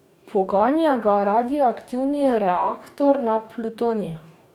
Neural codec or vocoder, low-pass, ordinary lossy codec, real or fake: codec, 44.1 kHz, 2.6 kbps, DAC; 19.8 kHz; none; fake